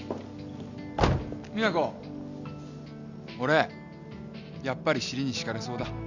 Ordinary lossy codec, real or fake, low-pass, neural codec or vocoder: none; real; 7.2 kHz; none